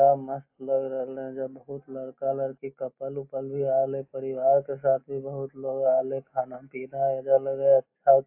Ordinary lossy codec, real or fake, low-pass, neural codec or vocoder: AAC, 32 kbps; real; 3.6 kHz; none